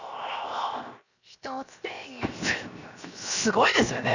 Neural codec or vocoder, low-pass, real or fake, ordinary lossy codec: codec, 16 kHz, 0.7 kbps, FocalCodec; 7.2 kHz; fake; none